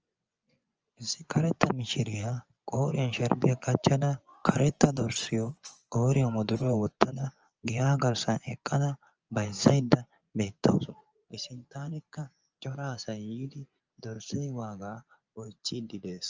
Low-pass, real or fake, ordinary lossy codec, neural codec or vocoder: 7.2 kHz; fake; Opus, 24 kbps; vocoder, 24 kHz, 100 mel bands, Vocos